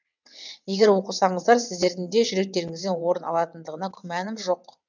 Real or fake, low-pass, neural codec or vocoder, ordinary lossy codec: fake; 7.2 kHz; vocoder, 22.05 kHz, 80 mel bands, WaveNeXt; none